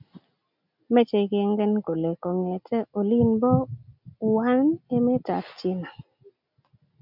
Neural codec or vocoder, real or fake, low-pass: none; real; 5.4 kHz